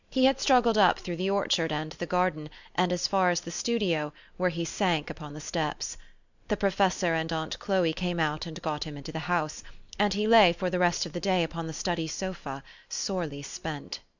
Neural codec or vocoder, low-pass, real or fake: none; 7.2 kHz; real